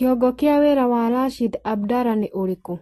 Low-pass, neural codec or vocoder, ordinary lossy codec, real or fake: 19.8 kHz; none; AAC, 32 kbps; real